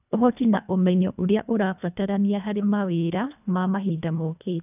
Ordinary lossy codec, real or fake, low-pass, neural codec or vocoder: none; fake; 3.6 kHz; codec, 24 kHz, 1.5 kbps, HILCodec